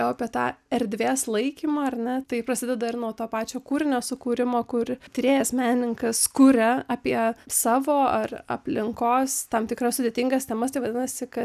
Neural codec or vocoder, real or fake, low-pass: none; real; 14.4 kHz